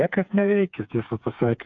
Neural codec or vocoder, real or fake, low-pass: codec, 16 kHz, 4 kbps, FreqCodec, smaller model; fake; 7.2 kHz